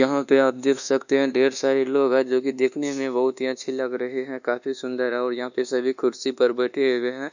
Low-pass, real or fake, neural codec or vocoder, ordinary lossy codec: 7.2 kHz; fake; codec, 24 kHz, 1.2 kbps, DualCodec; none